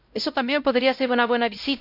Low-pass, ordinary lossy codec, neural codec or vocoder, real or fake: 5.4 kHz; none; codec, 16 kHz, 0.5 kbps, X-Codec, WavLM features, trained on Multilingual LibriSpeech; fake